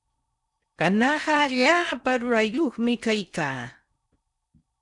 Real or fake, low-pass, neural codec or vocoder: fake; 10.8 kHz; codec, 16 kHz in and 24 kHz out, 0.6 kbps, FocalCodec, streaming, 4096 codes